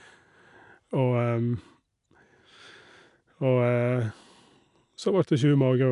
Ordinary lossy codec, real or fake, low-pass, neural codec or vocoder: none; real; 10.8 kHz; none